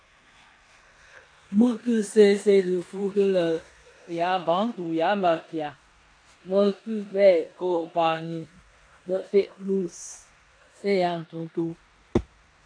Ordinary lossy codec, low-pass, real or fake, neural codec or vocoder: AAC, 48 kbps; 9.9 kHz; fake; codec, 16 kHz in and 24 kHz out, 0.9 kbps, LongCat-Audio-Codec, four codebook decoder